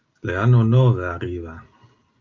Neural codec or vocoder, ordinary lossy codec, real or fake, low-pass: none; Opus, 32 kbps; real; 7.2 kHz